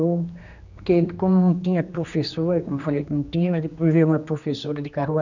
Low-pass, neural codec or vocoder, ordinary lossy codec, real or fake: 7.2 kHz; codec, 16 kHz, 2 kbps, X-Codec, HuBERT features, trained on general audio; none; fake